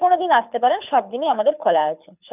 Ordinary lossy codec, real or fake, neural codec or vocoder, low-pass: none; fake; codec, 24 kHz, 6 kbps, HILCodec; 3.6 kHz